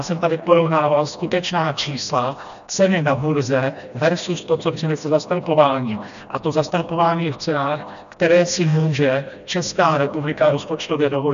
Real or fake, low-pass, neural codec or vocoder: fake; 7.2 kHz; codec, 16 kHz, 1 kbps, FreqCodec, smaller model